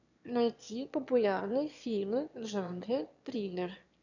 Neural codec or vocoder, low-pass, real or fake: autoencoder, 22.05 kHz, a latent of 192 numbers a frame, VITS, trained on one speaker; 7.2 kHz; fake